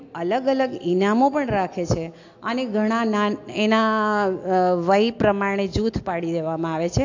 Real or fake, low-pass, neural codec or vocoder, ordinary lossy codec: real; 7.2 kHz; none; AAC, 48 kbps